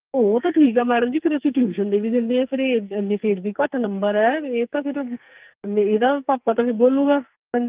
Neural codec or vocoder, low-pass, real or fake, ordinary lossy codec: codec, 44.1 kHz, 2.6 kbps, SNAC; 3.6 kHz; fake; Opus, 32 kbps